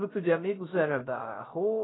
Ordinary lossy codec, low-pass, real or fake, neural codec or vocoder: AAC, 16 kbps; 7.2 kHz; fake; codec, 16 kHz, 0.3 kbps, FocalCodec